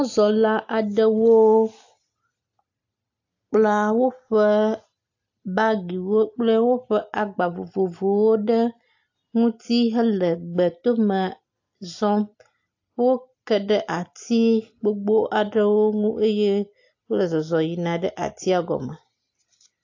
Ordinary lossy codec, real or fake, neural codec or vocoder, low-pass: AAC, 48 kbps; real; none; 7.2 kHz